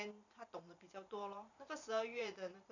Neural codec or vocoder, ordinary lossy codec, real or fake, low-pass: vocoder, 44.1 kHz, 128 mel bands every 512 samples, BigVGAN v2; none; fake; 7.2 kHz